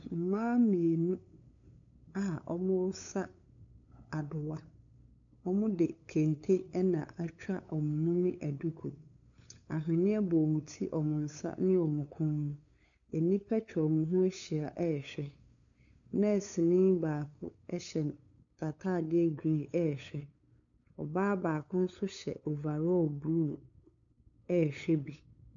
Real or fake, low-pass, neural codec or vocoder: fake; 7.2 kHz; codec, 16 kHz, 8 kbps, FunCodec, trained on LibriTTS, 25 frames a second